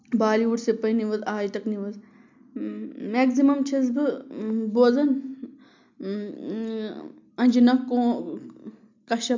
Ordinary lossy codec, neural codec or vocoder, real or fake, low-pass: MP3, 64 kbps; none; real; 7.2 kHz